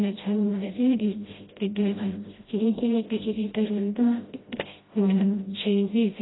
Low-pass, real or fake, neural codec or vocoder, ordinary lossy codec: 7.2 kHz; fake; codec, 16 kHz, 0.5 kbps, FreqCodec, smaller model; AAC, 16 kbps